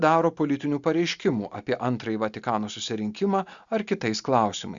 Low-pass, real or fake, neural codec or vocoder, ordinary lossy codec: 7.2 kHz; real; none; Opus, 64 kbps